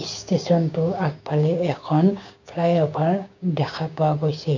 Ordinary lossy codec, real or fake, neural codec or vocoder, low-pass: none; fake; codec, 16 kHz, 6 kbps, DAC; 7.2 kHz